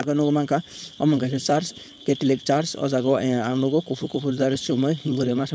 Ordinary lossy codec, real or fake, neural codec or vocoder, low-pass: none; fake; codec, 16 kHz, 4.8 kbps, FACodec; none